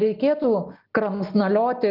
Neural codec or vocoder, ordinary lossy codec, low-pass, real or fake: none; Opus, 24 kbps; 5.4 kHz; real